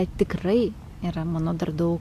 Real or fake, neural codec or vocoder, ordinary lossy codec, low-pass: real; none; AAC, 64 kbps; 14.4 kHz